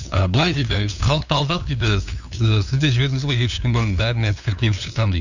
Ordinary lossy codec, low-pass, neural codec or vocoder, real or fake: none; 7.2 kHz; codec, 16 kHz, 2 kbps, FunCodec, trained on LibriTTS, 25 frames a second; fake